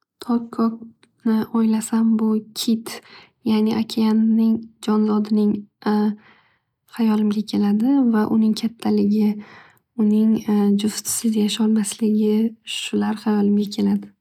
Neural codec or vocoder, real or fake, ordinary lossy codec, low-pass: none; real; none; 19.8 kHz